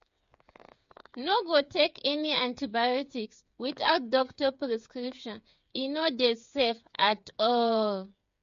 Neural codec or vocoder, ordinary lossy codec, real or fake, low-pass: codec, 16 kHz, 8 kbps, FreqCodec, smaller model; MP3, 48 kbps; fake; 7.2 kHz